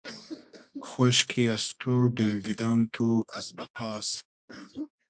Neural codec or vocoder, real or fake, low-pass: codec, 24 kHz, 0.9 kbps, WavTokenizer, medium music audio release; fake; 9.9 kHz